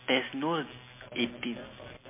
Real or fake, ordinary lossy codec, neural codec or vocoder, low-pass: real; MP3, 24 kbps; none; 3.6 kHz